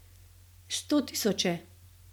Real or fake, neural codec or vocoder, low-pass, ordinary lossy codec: real; none; none; none